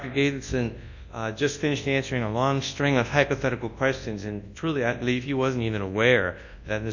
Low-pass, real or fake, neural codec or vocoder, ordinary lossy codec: 7.2 kHz; fake; codec, 24 kHz, 0.9 kbps, WavTokenizer, large speech release; MP3, 48 kbps